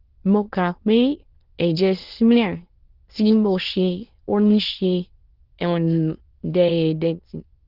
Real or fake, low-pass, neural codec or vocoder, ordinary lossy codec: fake; 5.4 kHz; autoencoder, 22.05 kHz, a latent of 192 numbers a frame, VITS, trained on many speakers; Opus, 16 kbps